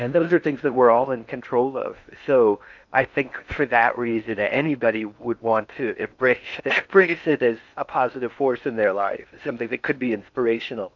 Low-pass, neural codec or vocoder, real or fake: 7.2 kHz; codec, 16 kHz in and 24 kHz out, 0.8 kbps, FocalCodec, streaming, 65536 codes; fake